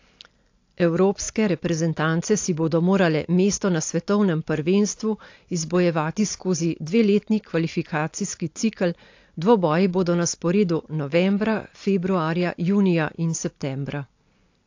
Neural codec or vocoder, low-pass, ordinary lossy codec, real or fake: none; 7.2 kHz; AAC, 48 kbps; real